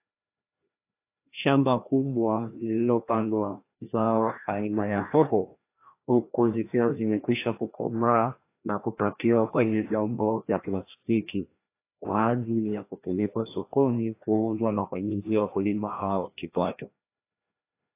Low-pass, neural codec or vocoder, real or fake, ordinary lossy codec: 3.6 kHz; codec, 16 kHz, 1 kbps, FreqCodec, larger model; fake; AAC, 24 kbps